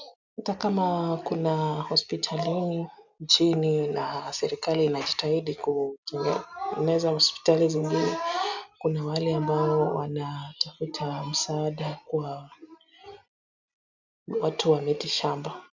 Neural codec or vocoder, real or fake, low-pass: none; real; 7.2 kHz